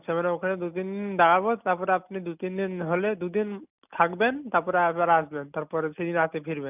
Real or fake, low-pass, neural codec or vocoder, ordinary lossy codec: real; 3.6 kHz; none; none